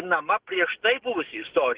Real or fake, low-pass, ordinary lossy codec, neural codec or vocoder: real; 3.6 kHz; Opus, 16 kbps; none